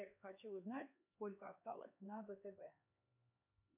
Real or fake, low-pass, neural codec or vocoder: fake; 3.6 kHz; codec, 16 kHz, 2 kbps, X-Codec, HuBERT features, trained on LibriSpeech